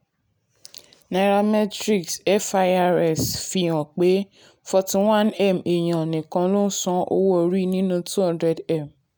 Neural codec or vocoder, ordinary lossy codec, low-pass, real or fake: none; none; none; real